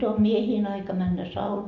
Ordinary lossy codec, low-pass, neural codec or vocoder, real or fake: none; 7.2 kHz; none; real